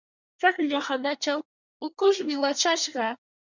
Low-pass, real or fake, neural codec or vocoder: 7.2 kHz; fake; codec, 24 kHz, 1 kbps, SNAC